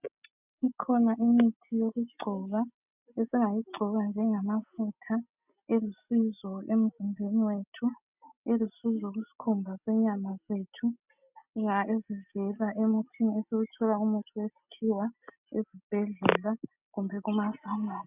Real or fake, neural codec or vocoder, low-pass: real; none; 3.6 kHz